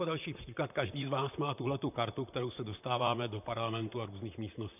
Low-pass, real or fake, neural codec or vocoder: 3.6 kHz; fake; vocoder, 44.1 kHz, 128 mel bands, Pupu-Vocoder